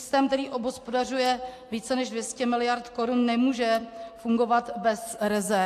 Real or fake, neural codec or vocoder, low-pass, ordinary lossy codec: real; none; 14.4 kHz; AAC, 64 kbps